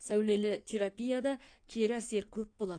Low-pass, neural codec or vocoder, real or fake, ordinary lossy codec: 9.9 kHz; codec, 16 kHz in and 24 kHz out, 1.1 kbps, FireRedTTS-2 codec; fake; AAC, 48 kbps